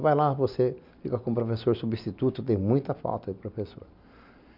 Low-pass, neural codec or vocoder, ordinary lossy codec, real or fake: 5.4 kHz; none; none; real